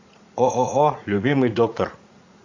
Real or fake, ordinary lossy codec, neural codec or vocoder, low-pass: fake; AAC, 48 kbps; vocoder, 44.1 kHz, 80 mel bands, Vocos; 7.2 kHz